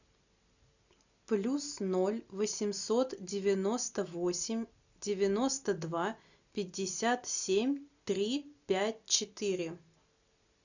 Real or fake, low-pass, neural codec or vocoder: real; 7.2 kHz; none